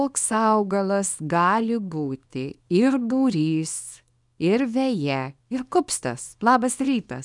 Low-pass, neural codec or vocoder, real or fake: 10.8 kHz; codec, 24 kHz, 0.9 kbps, WavTokenizer, small release; fake